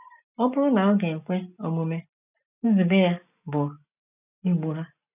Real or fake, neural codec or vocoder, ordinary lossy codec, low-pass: real; none; none; 3.6 kHz